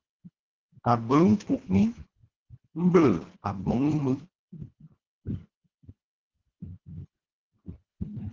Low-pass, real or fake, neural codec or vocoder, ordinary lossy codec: 7.2 kHz; fake; codec, 24 kHz, 1.5 kbps, HILCodec; Opus, 16 kbps